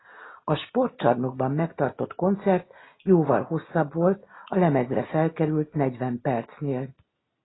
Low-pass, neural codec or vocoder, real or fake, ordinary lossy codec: 7.2 kHz; none; real; AAC, 16 kbps